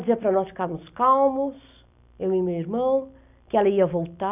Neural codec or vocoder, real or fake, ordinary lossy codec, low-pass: none; real; none; 3.6 kHz